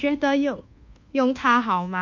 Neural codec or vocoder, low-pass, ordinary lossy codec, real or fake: codec, 24 kHz, 1.2 kbps, DualCodec; 7.2 kHz; MP3, 48 kbps; fake